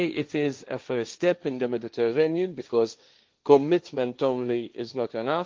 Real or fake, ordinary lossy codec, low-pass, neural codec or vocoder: fake; Opus, 32 kbps; 7.2 kHz; codec, 16 kHz, 1.1 kbps, Voila-Tokenizer